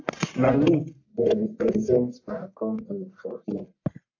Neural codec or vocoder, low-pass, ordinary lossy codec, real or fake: codec, 44.1 kHz, 1.7 kbps, Pupu-Codec; 7.2 kHz; AAC, 48 kbps; fake